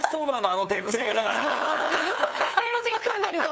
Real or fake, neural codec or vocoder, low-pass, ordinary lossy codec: fake; codec, 16 kHz, 2 kbps, FunCodec, trained on LibriTTS, 25 frames a second; none; none